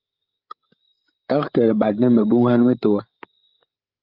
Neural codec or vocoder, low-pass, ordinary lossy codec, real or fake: codec, 16 kHz, 16 kbps, FreqCodec, larger model; 5.4 kHz; Opus, 24 kbps; fake